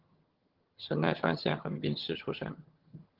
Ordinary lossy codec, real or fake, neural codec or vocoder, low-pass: Opus, 16 kbps; fake; vocoder, 22.05 kHz, 80 mel bands, HiFi-GAN; 5.4 kHz